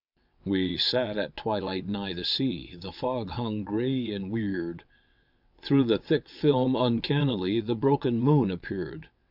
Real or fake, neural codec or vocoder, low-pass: fake; vocoder, 22.05 kHz, 80 mel bands, WaveNeXt; 5.4 kHz